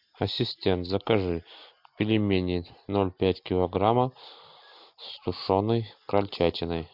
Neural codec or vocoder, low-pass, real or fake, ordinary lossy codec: none; 5.4 kHz; real; MP3, 48 kbps